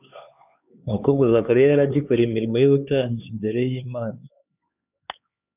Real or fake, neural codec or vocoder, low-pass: fake; codec, 16 kHz, 4 kbps, X-Codec, HuBERT features, trained on LibriSpeech; 3.6 kHz